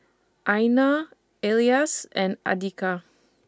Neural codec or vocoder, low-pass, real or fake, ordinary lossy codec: none; none; real; none